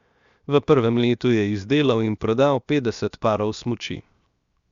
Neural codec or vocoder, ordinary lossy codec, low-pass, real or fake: codec, 16 kHz, 0.7 kbps, FocalCodec; Opus, 64 kbps; 7.2 kHz; fake